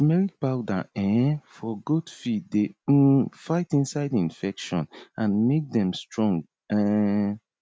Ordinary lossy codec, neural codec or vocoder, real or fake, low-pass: none; none; real; none